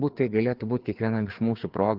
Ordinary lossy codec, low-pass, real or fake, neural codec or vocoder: Opus, 16 kbps; 5.4 kHz; fake; codec, 16 kHz, 4 kbps, FreqCodec, larger model